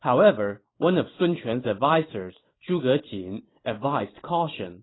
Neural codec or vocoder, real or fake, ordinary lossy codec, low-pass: vocoder, 44.1 kHz, 128 mel bands every 512 samples, BigVGAN v2; fake; AAC, 16 kbps; 7.2 kHz